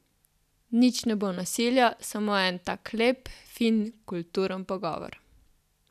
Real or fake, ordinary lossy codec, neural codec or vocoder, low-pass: real; none; none; 14.4 kHz